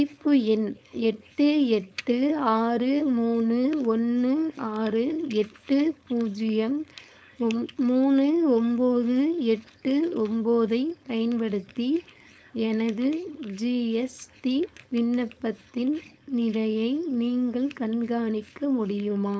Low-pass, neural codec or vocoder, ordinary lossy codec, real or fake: none; codec, 16 kHz, 4.8 kbps, FACodec; none; fake